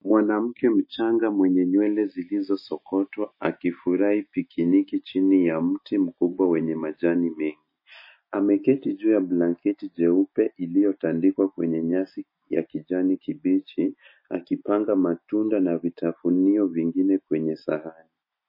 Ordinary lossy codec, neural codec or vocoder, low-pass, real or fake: MP3, 24 kbps; none; 5.4 kHz; real